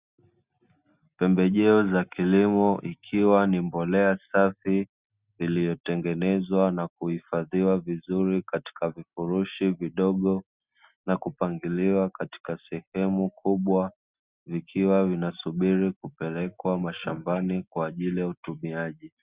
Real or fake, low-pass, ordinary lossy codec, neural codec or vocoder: real; 3.6 kHz; Opus, 64 kbps; none